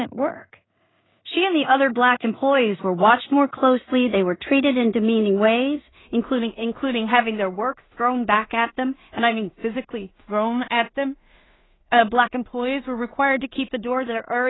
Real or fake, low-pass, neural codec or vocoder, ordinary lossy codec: fake; 7.2 kHz; codec, 16 kHz in and 24 kHz out, 0.4 kbps, LongCat-Audio-Codec, two codebook decoder; AAC, 16 kbps